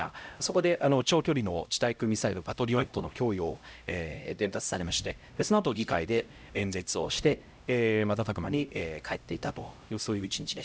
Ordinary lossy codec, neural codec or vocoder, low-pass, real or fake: none; codec, 16 kHz, 0.5 kbps, X-Codec, HuBERT features, trained on LibriSpeech; none; fake